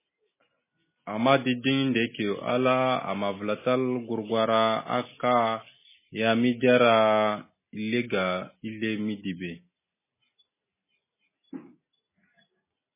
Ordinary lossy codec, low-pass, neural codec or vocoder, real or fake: MP3, 16 kbps; 3.6 kHz; none; real